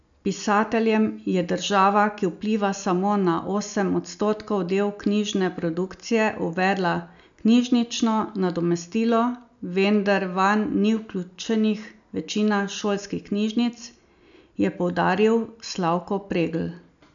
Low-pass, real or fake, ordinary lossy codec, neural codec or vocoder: 7.2 kHz; real; none; none